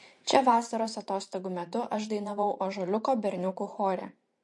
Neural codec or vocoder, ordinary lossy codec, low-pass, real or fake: vocoder, 44.1 kHz, 128 mel bands every 512 samples, BigVGAN v2; MP3, 48 kbps; 10.8 kHz; fake